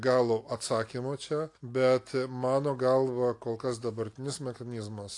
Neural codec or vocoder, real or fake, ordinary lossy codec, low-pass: none; real; AAC, 48 kbps; 10.8 kHz